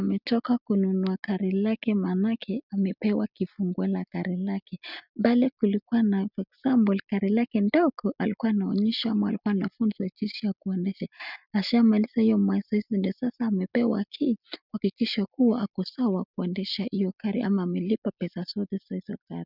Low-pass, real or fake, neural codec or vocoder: 5.4 kHz; real; none